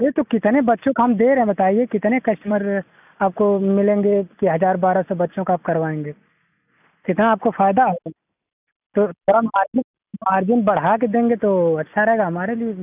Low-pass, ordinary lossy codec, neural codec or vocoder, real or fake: 3.6 kHz; none; none; real